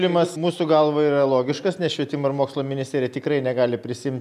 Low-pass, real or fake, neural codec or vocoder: 14.4 kHz; real; none